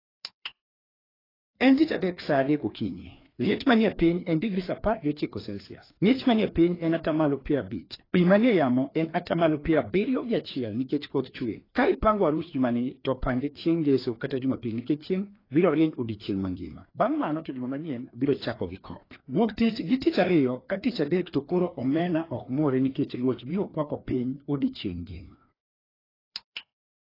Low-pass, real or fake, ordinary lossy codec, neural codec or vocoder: 5.4 kHz; fake; AAC, 24 kbps; codec, 16 kHz, 2 kbps, FreqCodec, larger model